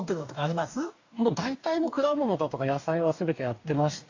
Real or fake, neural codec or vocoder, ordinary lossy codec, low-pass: fake; codec, 44.1 kHz, 2.6 kbps, DAC; AAC, 48 kbps; 7.2 kHz